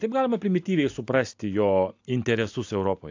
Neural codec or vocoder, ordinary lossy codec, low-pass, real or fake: none; AAC, 48 kbps; 7.2 kHz; real